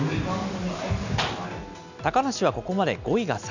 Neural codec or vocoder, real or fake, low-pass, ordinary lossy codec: none; real; 7.2 kHz; none